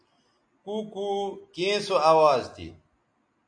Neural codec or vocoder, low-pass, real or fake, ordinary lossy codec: none; 9.9 kHz; real; AAC, 64 kbps